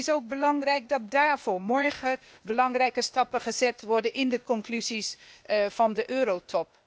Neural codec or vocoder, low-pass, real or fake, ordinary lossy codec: codec, 16 kHz, 0.8 kbps, ZipCodec; none; fake; none